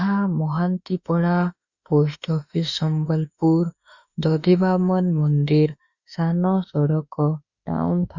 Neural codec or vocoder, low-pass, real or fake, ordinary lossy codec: autoencoder, 48 kHz, 32 numbers a frame, DAC-VAE, trained on Japanese speech; 7.2 kHz; fake; Opus, 64 kbps